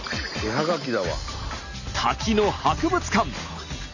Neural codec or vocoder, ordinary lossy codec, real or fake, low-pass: none; none; real; 7.2 kHz